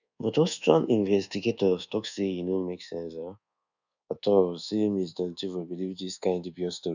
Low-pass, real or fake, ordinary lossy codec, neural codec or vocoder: 7.2 kHz; fake; none; codec, 24 kHz, 1.2 kbps, DualCodec